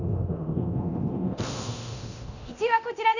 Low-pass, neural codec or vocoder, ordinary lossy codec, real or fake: 7.2 kHz; codec, 24 kHz, 0.9 kbps, DualCodec; none; fake